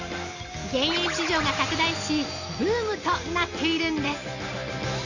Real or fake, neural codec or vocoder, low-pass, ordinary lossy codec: real; none; 7.2 kHz; none